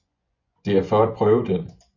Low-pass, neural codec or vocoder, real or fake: 7.2 kHz; vocoder, 24 kHz, 100 mel bands, Vocos; fake